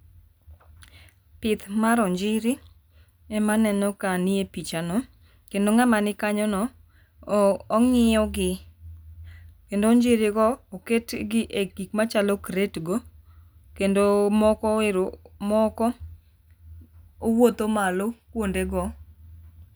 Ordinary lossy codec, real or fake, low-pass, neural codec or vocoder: none; real; none; none